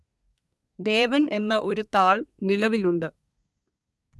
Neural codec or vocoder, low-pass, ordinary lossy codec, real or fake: codec, 24 kHz, 1 kbps, SNAC; none; none; fake